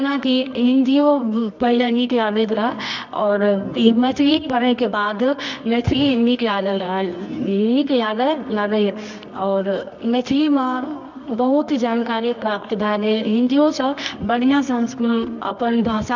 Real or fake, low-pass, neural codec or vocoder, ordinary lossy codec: fake; 7.2 kHz; codec, 24 kHz, 0.9 kbps, WavTokenizer, medium music audio release; none